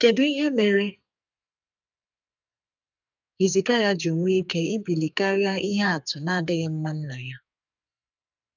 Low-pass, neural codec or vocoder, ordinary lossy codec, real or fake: 7.2 kHz; codec, 44.1 kHz, 2.6 kbps, SNAC; none; fake